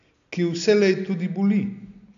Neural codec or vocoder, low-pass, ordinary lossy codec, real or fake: none; 7.2 kHz; none; real